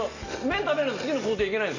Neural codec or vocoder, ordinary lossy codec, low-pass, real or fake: none; Opus, 64 kbps; 7.2 kHz; real